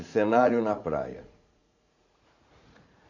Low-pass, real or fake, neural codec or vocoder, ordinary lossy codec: 7.2 kHz; fake; vocoder, 44.1 kHz, 80 mel bands, Vocos; none